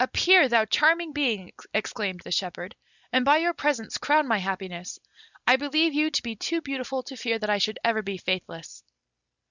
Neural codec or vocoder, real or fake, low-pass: none; real; 7.2 kHz